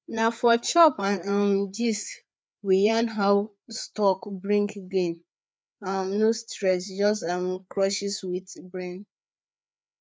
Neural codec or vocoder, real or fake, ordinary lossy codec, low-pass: codec, 16 kHz, 4 kbps, FreqCodec, larger model; fake; none; none